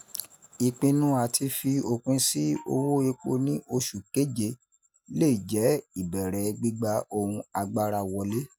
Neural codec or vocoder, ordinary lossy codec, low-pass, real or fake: none; none; none; real